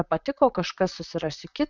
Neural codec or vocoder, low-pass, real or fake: vocoder, 44.1 kHz, 128 mel bands, Pupu-Vocoder; 7.2 kHz; fake